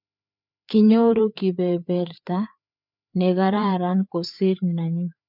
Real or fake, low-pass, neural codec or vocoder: fake; 5.4 kHz; codec, 16 kHz, 4 kbps, FreqCodec, larger model